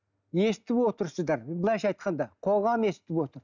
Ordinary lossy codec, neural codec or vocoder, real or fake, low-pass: none; none; real; 7.2 kHz